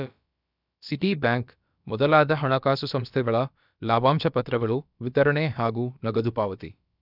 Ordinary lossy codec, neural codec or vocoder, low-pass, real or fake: none; codec, 16 kHz, about 1 kbps, DyCAST, with the encoder's durations; 5.4 kHz; fake